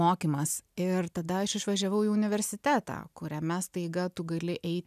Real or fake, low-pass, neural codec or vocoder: real; 14.4 kHz; none